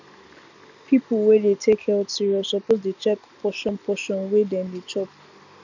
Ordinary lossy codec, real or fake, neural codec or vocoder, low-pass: none; real; none; 7.2 kHz